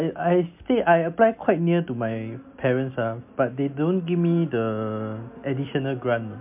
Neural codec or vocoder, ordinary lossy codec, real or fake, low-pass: none; MP3, 32 kbps; real; 3.6 kHz